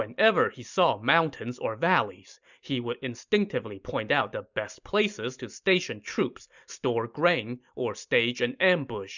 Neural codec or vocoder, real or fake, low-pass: none; real; 7.2 kHz